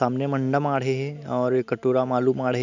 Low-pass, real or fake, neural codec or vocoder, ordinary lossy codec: 7.2 kHz; real; none; none